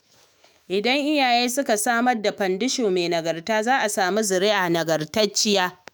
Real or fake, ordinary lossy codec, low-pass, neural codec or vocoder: fake; none; none; autoencoder, 48 kHz, 128 numbers a frame, DAC-VAE, trained on Japanese speech